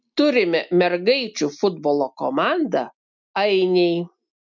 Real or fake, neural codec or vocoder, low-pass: real; none; 7.2 kHz